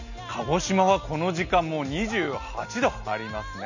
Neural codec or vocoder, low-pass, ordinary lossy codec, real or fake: none; 7.2 kHz; none; real